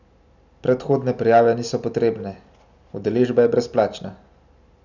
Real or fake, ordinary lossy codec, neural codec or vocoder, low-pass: real; none; none; 7.2 kHz